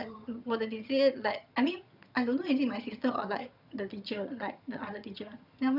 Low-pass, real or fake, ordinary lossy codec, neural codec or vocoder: 5.4 kHz; fake; none; vocoder, 22.05 kHz, 80 mel bands, HiFi-GAN